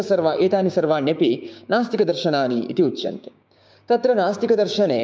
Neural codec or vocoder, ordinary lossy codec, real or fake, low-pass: codec, 16 kHz, 6 kbps, DAC; none; fake; none